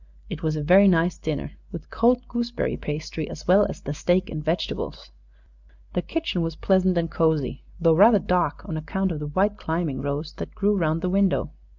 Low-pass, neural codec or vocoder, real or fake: 7.2 kHz; none; real